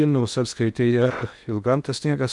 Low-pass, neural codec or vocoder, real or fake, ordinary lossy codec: 10.8 kHz; codec, 16 kHz in and 24 kHz out, 0.8 kbps, FocalCodec, streaming, 65536 codes; fake; MP3, 96 kbps